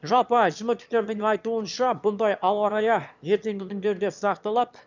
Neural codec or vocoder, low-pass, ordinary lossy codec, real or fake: autoencoder, 22.05 kHz, a latent of 192 numbers a frame, VITS, trained on one speaker; 7.2 kHz; none; fake